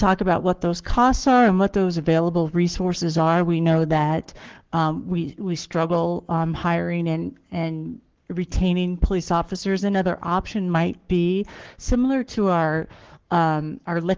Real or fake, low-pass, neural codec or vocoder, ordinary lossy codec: fake; 7.2 kHz; codec, 44.1 kHz, 7.8 kbps, Pupu-Codec; Opus, 16 kbps